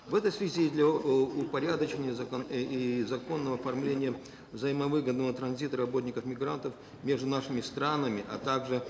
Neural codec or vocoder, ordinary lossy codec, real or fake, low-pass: none; none; real; none